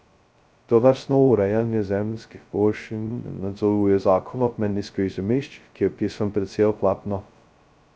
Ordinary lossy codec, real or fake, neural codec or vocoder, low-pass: none; fake; codec, 16 kHz, 0.2 kbps, FocalCodec; none